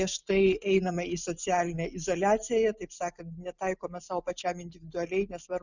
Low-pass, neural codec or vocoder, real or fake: 7.2 kHz; none; real